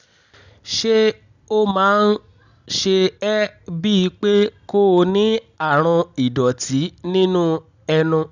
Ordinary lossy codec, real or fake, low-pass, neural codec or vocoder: none; real; 7.2 kHz; none